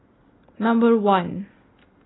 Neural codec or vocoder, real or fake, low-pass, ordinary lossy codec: none; real; 7.2 kHz; AAC, 16 kbps